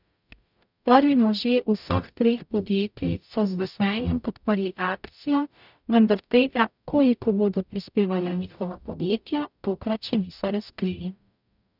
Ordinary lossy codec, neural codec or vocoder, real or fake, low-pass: none; codec, 44.1 kHz, 0.9 kbps, DAC; fake; 5.4 kHz